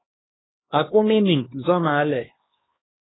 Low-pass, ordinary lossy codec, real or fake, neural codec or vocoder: 7.2 kHz; AAC, 16 kbps; fake; codec, 16 kHz, 2 kbps, X-Codec, HuBERT features, trained on LibriSpeech